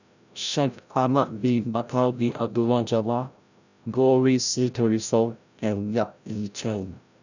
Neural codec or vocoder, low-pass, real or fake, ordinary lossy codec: codec, 16 kHz, 0.5 kbps, FreqCodec, larger model; 7.2 kHz; fake; none